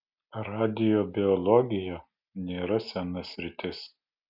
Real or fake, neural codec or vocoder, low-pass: real; none; 5.4 kHz